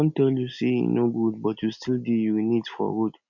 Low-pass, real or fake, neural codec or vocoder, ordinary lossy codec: 7.2 kHz; real; none; none